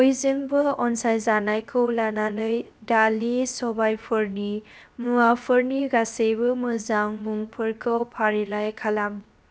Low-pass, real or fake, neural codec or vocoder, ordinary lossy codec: none; fake; codec, 16 kHz, 0.7 kbps, FocalCodec; none